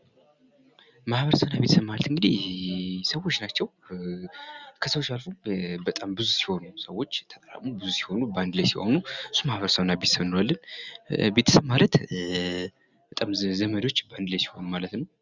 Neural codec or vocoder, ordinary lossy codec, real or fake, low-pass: none; Opus, 64 kbps; real; 7.2 kHz